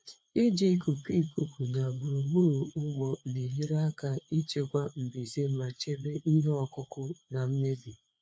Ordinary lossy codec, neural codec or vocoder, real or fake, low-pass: none; codec, 16 kHz, 4 kbps, FreqCodec, larger model; fake; none